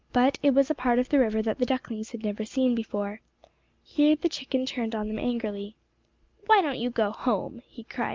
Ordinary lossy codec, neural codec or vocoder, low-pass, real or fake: Opus, 24 kbps; none; 7.2 kHz; real